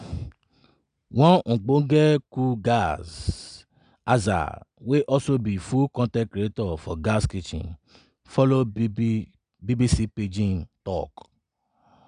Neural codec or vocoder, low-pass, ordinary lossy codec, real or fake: none; 9.9 kHz; none; real